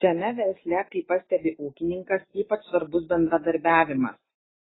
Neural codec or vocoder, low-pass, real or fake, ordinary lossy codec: none; 7.2 kHz; real; AAC, 16 kbps